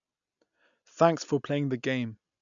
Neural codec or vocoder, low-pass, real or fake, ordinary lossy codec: none; 7.2 kHz; real; MP3, 96 kbps